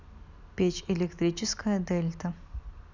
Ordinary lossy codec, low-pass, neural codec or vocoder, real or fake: none; 7.2 kHz; none; real